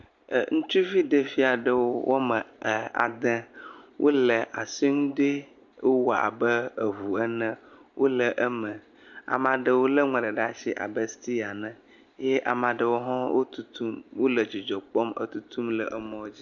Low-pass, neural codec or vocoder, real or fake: 7.2 kHz; none; real